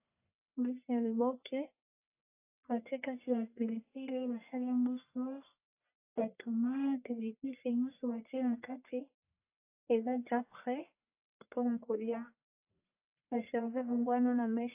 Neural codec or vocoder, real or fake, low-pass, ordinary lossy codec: codec, 44.1 kHz, 1.7 kbps, Pupu-Codec; fake; 3.6 kHz; AAC, 32 kbps